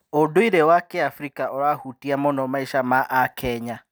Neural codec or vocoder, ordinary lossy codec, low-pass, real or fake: none; none; none; real